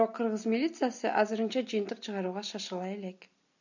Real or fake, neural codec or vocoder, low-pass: real; none; 7.2 kHz